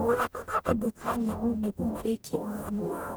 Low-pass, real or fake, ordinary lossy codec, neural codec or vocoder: none; fake; none; codec, 44.1 kHz, 0.9 kbps, DAC